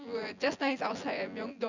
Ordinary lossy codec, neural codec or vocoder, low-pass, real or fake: none; vocoder, 24 kHz, 100 mel bands, Vocos; 7.2 kHz; fake